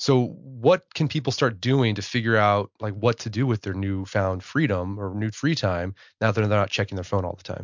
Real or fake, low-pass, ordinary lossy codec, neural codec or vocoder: real; 7.2 kHz; MP3, 64 kbps; none